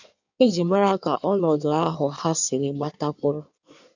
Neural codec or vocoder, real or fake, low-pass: codec, 16 kHz in and 24 kHz out, 1.1 kbps, FireRedTTS-2 codec; fake; 7.2 kHz